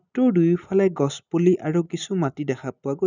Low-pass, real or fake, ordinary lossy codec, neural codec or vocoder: 7.2 kHz; real; none; none